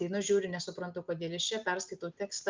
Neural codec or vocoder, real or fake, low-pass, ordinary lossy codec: none; real; 7.2 kHz; Opus, 24 kbps